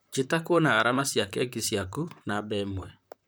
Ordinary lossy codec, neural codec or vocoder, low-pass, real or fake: none; vocoder, 44.1 kHz, 128 mel bands, Pupu-Vocoder; none; fake